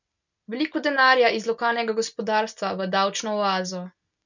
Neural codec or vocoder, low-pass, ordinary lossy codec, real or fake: none; 7.2 kHz; none; real